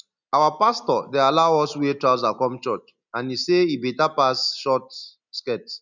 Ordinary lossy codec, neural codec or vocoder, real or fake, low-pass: none; none; real; 7.2 kHz